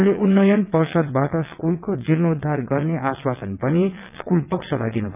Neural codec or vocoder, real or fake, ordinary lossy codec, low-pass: vocoder, 22.05 kHz, 80 mel bands, WaveNeXt; fake; none; 3.6 kHz